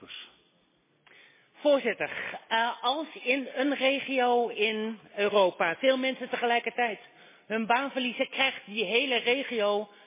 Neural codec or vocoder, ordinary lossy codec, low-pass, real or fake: none; MP3, 16 kbps; 3.6 kHz; real